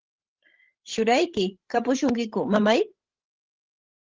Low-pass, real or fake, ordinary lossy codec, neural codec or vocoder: 7.2 kHz; fake; Opus, 16 kbps; codec, 16 kHz, 16 kbps, FreqCodec, larger model